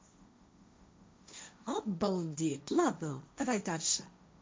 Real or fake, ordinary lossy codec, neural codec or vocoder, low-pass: fake; none; codec, 16 kHz, 1.1 kbps, Voila-Tokenizer; none